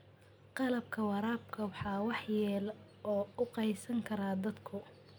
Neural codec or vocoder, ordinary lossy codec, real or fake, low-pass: none; none; real; none